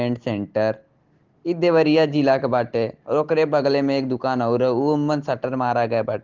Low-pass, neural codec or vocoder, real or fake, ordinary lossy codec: 7.2 kHz; none; real; Opus, 16 kbps